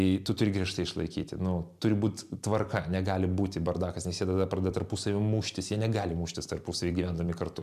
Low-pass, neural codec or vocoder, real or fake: 14.4 kHz; vocoder, 44.1 kHz, 128 mel bands every 256 samples, BigVGAN v2; fake